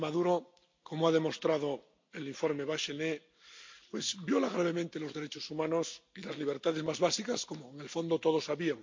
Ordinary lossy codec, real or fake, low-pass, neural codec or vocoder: MP3, 48 kbps; real; 7.2 kHz; none